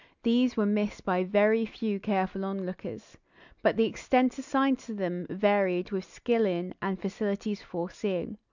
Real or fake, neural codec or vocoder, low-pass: real; none; 7.2 kHz